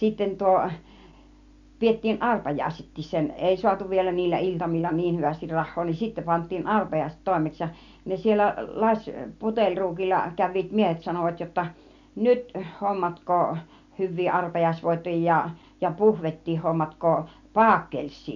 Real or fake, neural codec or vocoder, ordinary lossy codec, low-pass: real; none; none; 7.2 kHz